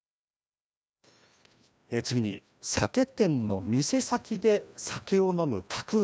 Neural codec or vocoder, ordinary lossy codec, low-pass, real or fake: codec, 16 kHz, 1 kbps, FreqCodec, larger model; none; none; fake